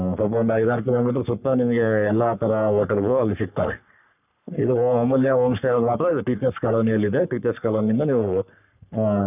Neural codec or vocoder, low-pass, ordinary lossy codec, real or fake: codec, 44.1 kHz, 3.4 kbps, Pupu-Codec; 3.6 kHz; none; fake